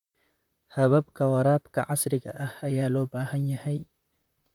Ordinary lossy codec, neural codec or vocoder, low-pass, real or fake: none; vocoder, 44.1 kHz, 128 mel bands, Pupu-Vocoder; 19.8 kHz; fake